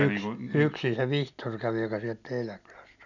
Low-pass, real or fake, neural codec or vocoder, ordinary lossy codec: 7.2 kHz; real; none; none